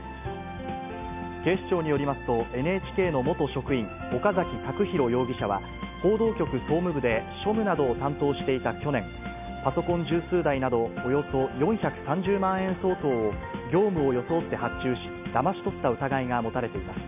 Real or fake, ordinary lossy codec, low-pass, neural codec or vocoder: real; none; 3.6 kHz; none